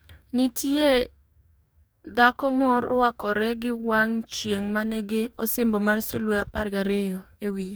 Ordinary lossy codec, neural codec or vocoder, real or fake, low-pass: none; codec, 44.1 kHz, 2.6 kbps, DAC; fake; none